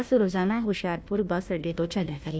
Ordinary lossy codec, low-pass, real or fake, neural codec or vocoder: none; none; fake; codec, 16 kHz, 1 kbps, FunCodec, trained on Chinese and English, 50 frames a second